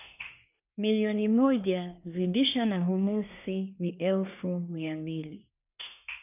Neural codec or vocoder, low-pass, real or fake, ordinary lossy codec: codec, 24 kHz, 1 kbps, SNAC; 3.6 kHz; fake; none